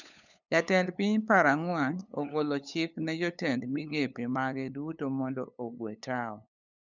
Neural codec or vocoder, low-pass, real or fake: codec, 16 kHz, 8 kbps, FunCodec, trained on LibriTTS, 25 frames a second; 7.2 kHz; fake